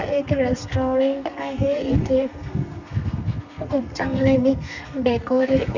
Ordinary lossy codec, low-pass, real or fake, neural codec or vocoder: none; 7.2 kHz; fake; codec, 32 kHz, 1.9 kbps, SNAC